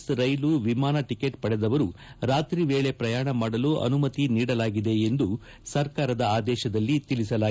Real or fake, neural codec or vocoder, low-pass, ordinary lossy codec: real; none; none; none